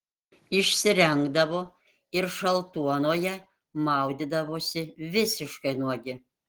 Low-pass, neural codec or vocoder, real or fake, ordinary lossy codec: 19.8 kHz; none; real; Opus, 16 kbps